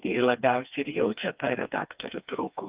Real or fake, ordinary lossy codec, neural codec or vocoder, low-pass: fake; Opus, 24 kbps; codec, 16 kHz, 2 kbps, FreqCodec, smaller model; 3.6 kHz